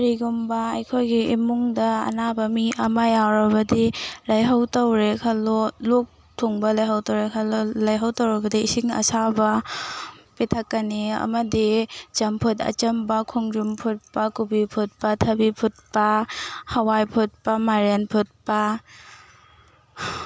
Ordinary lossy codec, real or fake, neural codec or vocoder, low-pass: none; real; none; none